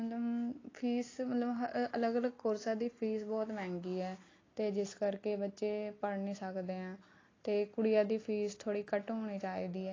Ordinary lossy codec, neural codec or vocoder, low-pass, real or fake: AAC, 32 kbps; none; 7.2 kHz; real